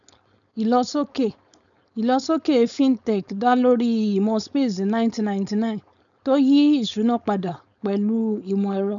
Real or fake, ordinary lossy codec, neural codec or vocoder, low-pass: fake; none; codec, 16 kHz, 4.8 kbps, FACodec; 7.2 kHz